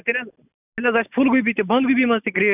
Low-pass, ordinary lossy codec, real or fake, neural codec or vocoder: 3.6 kHz; none; real; none